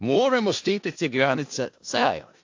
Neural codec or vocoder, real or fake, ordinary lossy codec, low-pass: codec, 16 kHz in and 24 kHz out, 0.4 kbps, LongCat-Audio-Codec, four codebook decoder; fake; AAC, 48 kbps; 7.2 kHz